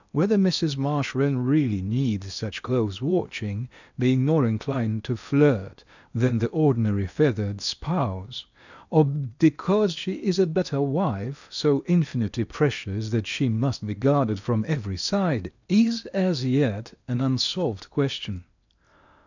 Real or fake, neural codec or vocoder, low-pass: fake; codec, 16 kHz in and 24 kHz out, 0.8 kbps, FocalCodec, streaming, 65536 codes; 7.2 kHz